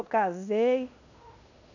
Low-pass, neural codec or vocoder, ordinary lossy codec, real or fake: 7.2 kHz; codec, 16 kHz in and 24 kHz out, 0.9 kbps, LongCat-Audio-Codec, fine tuned four codebook decoder; none; fake